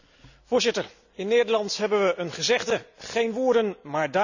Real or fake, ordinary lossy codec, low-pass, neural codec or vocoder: real; none; 7.2 kHz; none